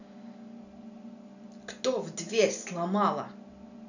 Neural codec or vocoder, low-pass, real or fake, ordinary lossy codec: none; 7.2 kHz; real; none